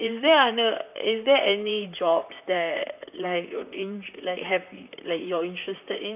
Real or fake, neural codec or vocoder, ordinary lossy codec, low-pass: fake; vocoder, 44.1 kHz, 128 mel bands, Pupu-Vocoder; none; 3.6 kHz